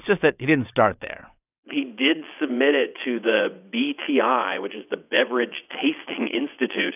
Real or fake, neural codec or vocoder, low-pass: fake; vocoder, 22.05 kHz, 80 mel bands, WaveNeXt; 3.6 kHz